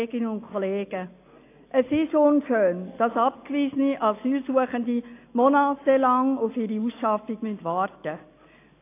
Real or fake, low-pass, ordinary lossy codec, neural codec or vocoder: real; 3.6 kHz; AAC, 24 kbps; none